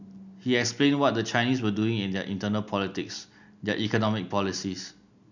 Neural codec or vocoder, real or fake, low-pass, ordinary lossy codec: none; real; 7.2 kHz; none